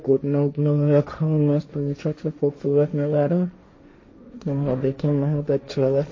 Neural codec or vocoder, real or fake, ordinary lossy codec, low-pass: codec, 16 kHz, 1.1 kbps, Voila-Tokenizer; fake; MP3, 32 kbps; 7.2 kHz